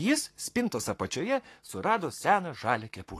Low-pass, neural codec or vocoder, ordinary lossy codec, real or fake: 14.4 kHz; codec, 44.1 kHz, 7.8 kbps, Pupu-Codec; AAC, 48 kbps; fake